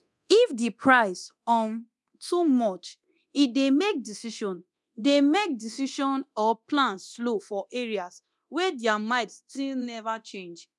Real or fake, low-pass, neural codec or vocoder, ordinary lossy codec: fake; none; codec, 24 kHz, 0.9 kbps, DualCodec; none